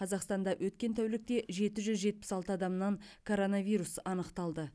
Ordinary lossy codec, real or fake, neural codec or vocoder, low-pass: none; real; none; none